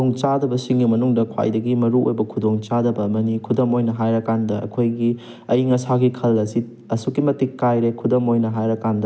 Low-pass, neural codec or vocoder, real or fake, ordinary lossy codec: none; none; real; none